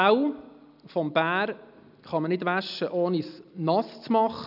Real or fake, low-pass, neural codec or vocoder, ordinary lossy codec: real; 5.4 kHz; none; none